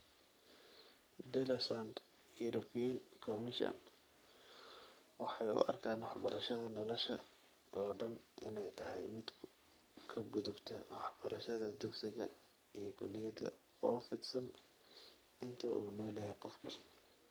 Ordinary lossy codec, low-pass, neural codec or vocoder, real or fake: none; none; codec, 44.1 kHz, 3.4 kbps, Pupu-Codec; fake